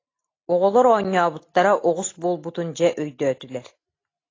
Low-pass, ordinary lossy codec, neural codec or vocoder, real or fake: 7.2 kHz; AAC, 32 kbps; none; real